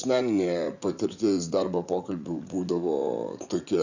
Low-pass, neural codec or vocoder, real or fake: 7.2 kHz; vocoder, 44.1 kHz, 80 mel bands, Vocos; fake